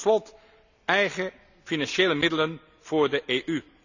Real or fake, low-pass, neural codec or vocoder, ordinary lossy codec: real; 7.2 kHz; none; none